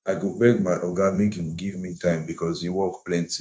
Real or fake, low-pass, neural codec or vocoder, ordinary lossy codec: fake; none; codec, 16 kHz, 0.9 kbps, LongCat-Audio-Codec; none